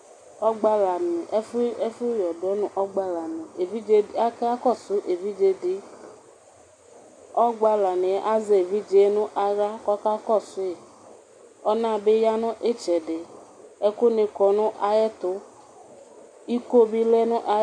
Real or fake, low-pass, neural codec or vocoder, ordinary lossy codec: real; 9.9 kHz; none; MP3, 64 kbps